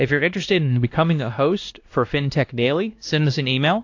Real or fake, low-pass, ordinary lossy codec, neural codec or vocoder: fake; 7.2 kHz; AAC, 48 kbps; codec, 16 kHz, 1 kbps, X-Codec, WavLM features, trained on Multilingual LibriSpeech